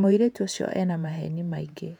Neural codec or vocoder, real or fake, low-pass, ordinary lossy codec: vocoder, 48 kHz, 128 mel bands, Vocos; fake; 19.8 kHz; none